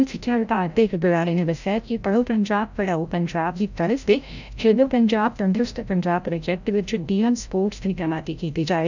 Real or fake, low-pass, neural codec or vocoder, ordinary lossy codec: fake; 7.2 kHz; codec, 16 kHz, 0.5 kbps, FreqCodec, larger model; none